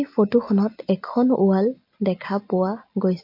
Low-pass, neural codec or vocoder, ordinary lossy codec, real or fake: 5.4 kHz; none; MP3, 32 kbps; real